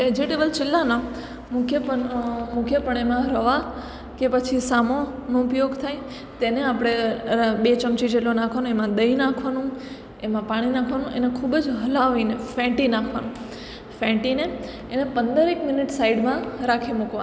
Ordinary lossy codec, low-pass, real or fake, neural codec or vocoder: none; none; real; none